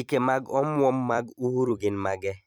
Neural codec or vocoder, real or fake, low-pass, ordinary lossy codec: vocoder, 44.1 kHz, 128 mel bands every 256 samples, BigVGAN v2; fake; 19.8 kHz; none